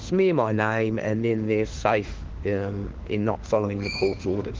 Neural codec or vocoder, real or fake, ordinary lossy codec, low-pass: autoencoder, 48 kHz, 32 numbers a frame, DAC-VAE, trained on Japanese speech; fake; Opus, 16 kbps; 7.2 kHz